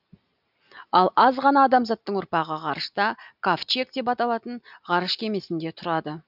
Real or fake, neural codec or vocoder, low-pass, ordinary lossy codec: real; none; 5.4 kHz; none